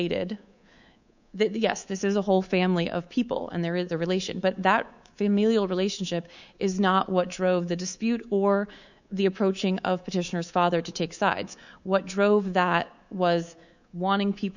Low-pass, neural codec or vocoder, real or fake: 7.2 kHz; codec, 24 kHz, 3.1 kbps, DualCodec; fake